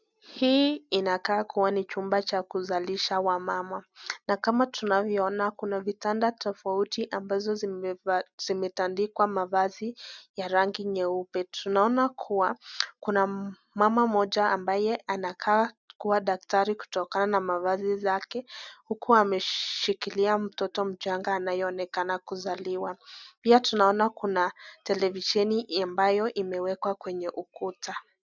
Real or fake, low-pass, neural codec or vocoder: real; 7.2 kHz; none